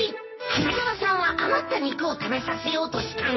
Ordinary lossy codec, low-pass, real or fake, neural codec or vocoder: MP3, 24 kbps; 7.2 kHz; fake; codec, 44.1 kHz, 2.6 kbps, SNAC